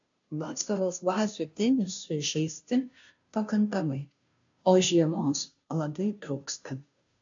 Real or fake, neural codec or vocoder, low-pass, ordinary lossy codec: fake; codec, 16 kHz, 0.5 kbps, FunCodec, trained on Chinese and English, 25 frames a second; 7.2 kHz; AAC, 64 kbps